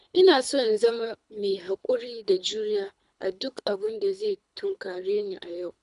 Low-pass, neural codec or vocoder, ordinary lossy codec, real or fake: 10.8 kHz; codec, 24 kHz, 3 kbps, HILCodec; none; fake